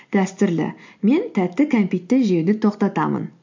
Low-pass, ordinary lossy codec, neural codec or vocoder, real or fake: 7.2 kHz; MP3, 48 kbps; none; real